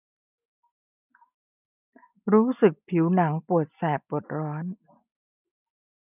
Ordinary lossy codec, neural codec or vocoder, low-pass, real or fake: none; none; 3.6 kHz; real